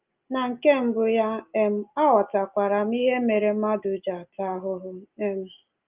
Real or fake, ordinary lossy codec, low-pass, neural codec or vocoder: real; Opus, 24 kbps; 3.6 kHz; none